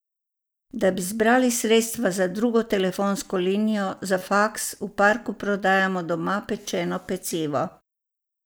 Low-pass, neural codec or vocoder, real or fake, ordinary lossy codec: none; none; real; none